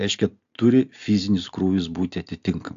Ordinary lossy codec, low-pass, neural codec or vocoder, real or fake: AAC, 64 kbps; 7.2 kHz; none; real